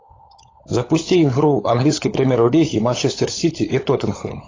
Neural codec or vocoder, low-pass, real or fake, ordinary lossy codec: codec, 16 kHz, 16 kbps, FunCodec, trained on LibriTTS, 50 frames a second; 7.2 kHz; fake; AAC, 32 kbps